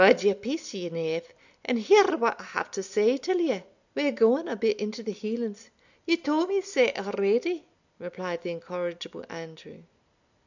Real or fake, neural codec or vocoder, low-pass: real; none; 7.2 kHz